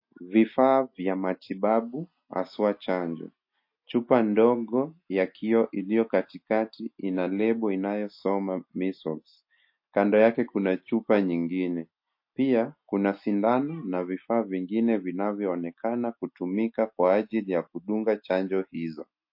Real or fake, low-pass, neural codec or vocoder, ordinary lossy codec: real; 5.4 kHz; none; MP3, 32 kbps